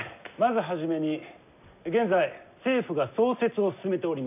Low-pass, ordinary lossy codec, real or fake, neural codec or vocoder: 3.6 kHz; none; real; none